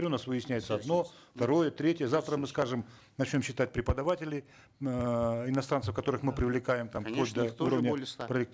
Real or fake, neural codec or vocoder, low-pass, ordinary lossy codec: real; none; none; none